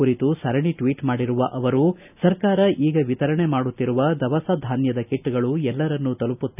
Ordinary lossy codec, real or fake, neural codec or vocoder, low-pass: none; real; none; 3.6 kHz